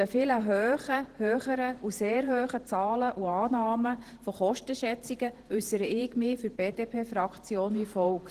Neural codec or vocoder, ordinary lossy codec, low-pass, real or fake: vocoder, 48 kHz, 128 mel bands, Vocos; Opus, 16 kbps; 14.4 kHz; fake